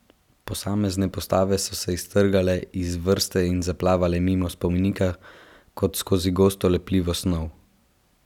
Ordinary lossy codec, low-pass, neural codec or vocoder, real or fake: none; 19.8 kHz; none; real